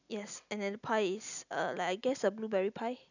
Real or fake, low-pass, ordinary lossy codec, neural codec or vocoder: real; 7.2 kHz; none; none